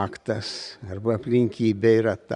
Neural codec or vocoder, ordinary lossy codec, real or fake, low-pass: vocoder, 44.1 kHz, 128 mel bands every 512 samples, BigVGAN v2; Opus, 64 kbps; fake; 10.8 kHz